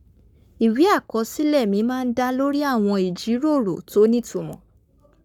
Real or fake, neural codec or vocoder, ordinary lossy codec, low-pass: fake; codec, 44.1 kHz, 7.8 kbps, Pupu-Codec; none; 19.8 kHz